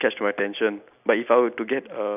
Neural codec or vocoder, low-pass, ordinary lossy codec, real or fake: none; 3.6 kHz; none; real